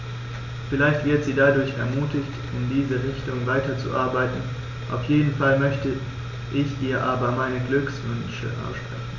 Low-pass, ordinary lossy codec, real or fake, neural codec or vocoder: 7.2 kHz; MP3, 64 kbps; real; none